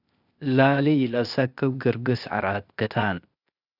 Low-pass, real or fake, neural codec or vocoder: 5.4 kHz; fake; codec, 16 kHz, 0.8 kbps, ZipCodec